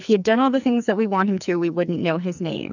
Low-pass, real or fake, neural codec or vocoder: 7.2 kHz; fake; codec, 44.1 kHz, 2.6 kbps, SNAC